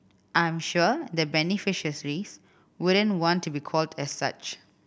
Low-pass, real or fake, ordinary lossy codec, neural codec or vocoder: none; real; none; none